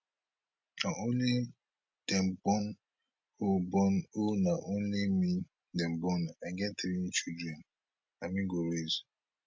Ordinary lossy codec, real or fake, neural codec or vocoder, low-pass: none; real; none; none